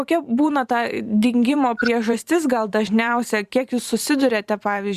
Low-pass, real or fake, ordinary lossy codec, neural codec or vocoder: 14.4 kHz; fake; Opus, 64 kbps; vocoder, 44.1 kHz, 128 mel bands every 512 samples, BigVGAN v2